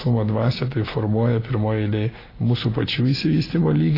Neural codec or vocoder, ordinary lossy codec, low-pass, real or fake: none; AAC, 24 kbps; 5.4 kHz; real